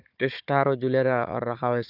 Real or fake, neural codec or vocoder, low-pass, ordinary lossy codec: fake; codec, 16 kHz, 4 kbps, FunCodec, trained on Chinese and English, 50 frames a second; 5.4 kHz; none